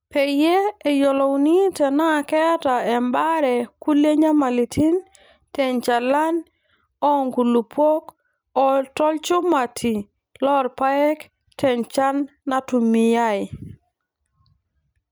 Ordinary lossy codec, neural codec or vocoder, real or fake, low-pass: none; none; real; none